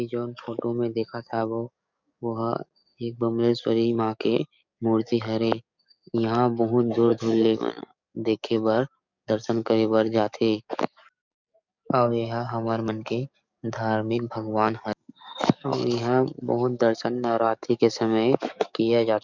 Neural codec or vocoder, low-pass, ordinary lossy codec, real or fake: codec, 44.1 kHz, 7.8 kbps, DAC; 7.2 kHz; none; fake